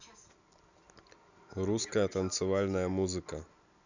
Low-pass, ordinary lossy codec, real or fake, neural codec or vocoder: 7.2 kHz; none; real; none